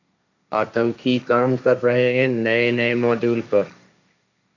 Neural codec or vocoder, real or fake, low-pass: codec, 16 kHz, 1.1 kbps, Voila-Tokenizer; fake; 7.2 kHz